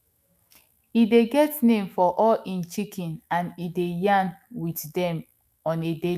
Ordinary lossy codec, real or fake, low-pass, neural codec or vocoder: none; fake; 14.4 kHz; autoencoder, 48 kHz, 128 numbers a frame, DAC-VAE, trained on Japanese speech